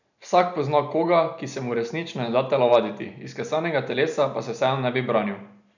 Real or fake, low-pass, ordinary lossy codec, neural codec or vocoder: real; 7.2 kHz; none; none